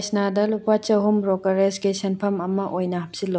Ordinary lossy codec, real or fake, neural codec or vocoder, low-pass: none; real; none; none